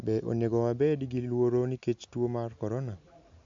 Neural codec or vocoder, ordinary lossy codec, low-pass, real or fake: none; MP3, 64 kbps; 7.2 kHz; real